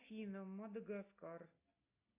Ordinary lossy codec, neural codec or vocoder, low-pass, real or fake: AAC, 24 kbps; none; 3.6 kHz; real